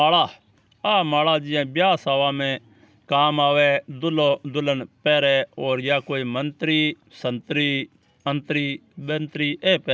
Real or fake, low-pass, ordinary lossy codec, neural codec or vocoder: real; none; none; none